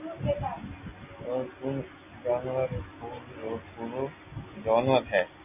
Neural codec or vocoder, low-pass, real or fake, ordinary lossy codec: none; 3.6 kHz; real; AAC, 32 kbps